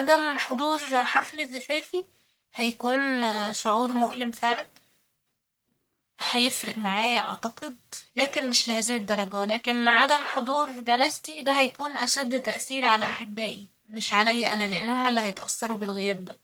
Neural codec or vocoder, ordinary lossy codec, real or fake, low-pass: codec, 44.1 kHz, 1.7 kbps, Pupu-Codec; none; fake; none